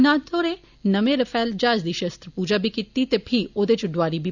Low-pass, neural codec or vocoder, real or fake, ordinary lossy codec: 7.2 kHz; none; real; none